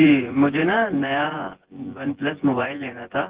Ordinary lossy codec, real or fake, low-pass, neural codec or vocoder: Opus, 16 kbps; fake; 3.6 kHz; vocoder, 24 kHz, 100 mel bands, Vocos